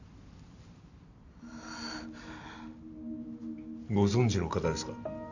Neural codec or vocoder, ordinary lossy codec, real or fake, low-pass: none; none; real; 7.2 kHz